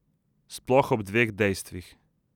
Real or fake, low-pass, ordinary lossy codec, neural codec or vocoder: real; 19.8 kHz; none; none